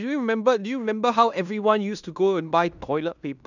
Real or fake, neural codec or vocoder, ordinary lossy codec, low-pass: fake; codec, 16 kHz in and 24 kHz out, 0.9 kbps, LongCat-Audio-Codec, fine tuned four codebook decoder; none; 7.2 kHz